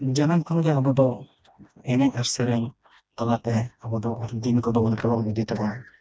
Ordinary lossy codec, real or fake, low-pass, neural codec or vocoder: none; fake; none; codec, 16 kHz, 1 kbps, FreqCodec, smaller model